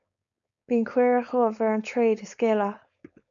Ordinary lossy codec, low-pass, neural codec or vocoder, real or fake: MP3, 64 kbps; 7.2 kHz; codec, 16 kHz, 4.8 kbps, FACodec; fake